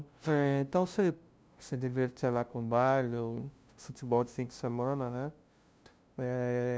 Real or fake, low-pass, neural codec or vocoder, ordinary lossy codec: fake; none; codec, 16 kHz, 0.5 kbps, FunCodec, trained on LibriTTS, 25 frames a second; none